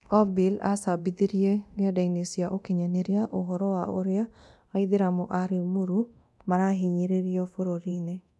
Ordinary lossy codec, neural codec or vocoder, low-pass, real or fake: none; codec, 24 kHz, 0.9 kbps, DualCodec; none; fake